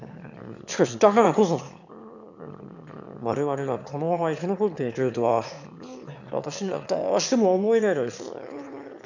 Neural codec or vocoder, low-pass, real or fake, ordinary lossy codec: autoencoder, 22.05 kHz, a latent of 192 numbers a frame, VITS, trained on one speaker; 7.2 kHz; fake; none